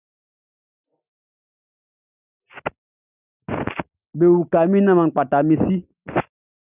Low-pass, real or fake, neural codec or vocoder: 3.6 kHz; real; none